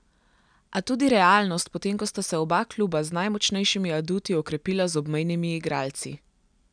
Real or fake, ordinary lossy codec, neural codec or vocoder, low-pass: real; none; none; 9.9 kHz